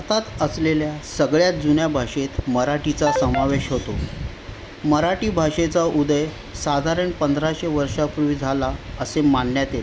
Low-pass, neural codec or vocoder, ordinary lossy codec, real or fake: none; none; none; real